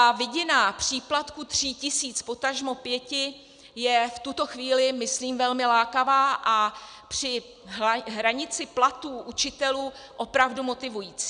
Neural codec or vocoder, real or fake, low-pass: none; real; 9.9 kHz